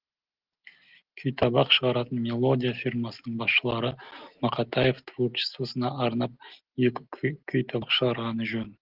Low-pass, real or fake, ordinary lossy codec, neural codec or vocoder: 5.4 kHz; real; Opus, 16 kbps; none